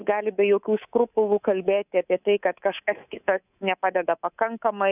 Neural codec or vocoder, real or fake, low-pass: codec, 24 kHz, 3.1 kbps, DualCodec; fake; 3.6 kHz